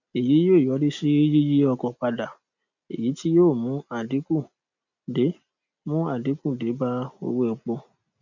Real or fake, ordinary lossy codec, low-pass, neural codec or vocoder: real; none; 7.2 kHz; none